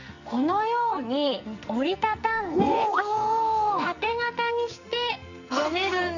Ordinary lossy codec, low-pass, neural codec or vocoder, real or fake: none; 7.2 kHz; codec, 44.1 kHz, 2.6 kbps, SNAC; fake